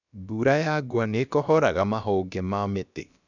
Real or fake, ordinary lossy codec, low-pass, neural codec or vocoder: fake; none; 7.2 kHz; codec, 16 kHz, about 1 kbps, DyCAST, with the encoder's durations